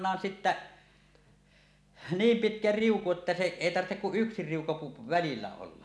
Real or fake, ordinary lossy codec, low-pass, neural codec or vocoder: real; none; none; none